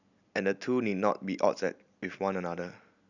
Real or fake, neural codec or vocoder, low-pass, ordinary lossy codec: real; none; 7.2 kHz; none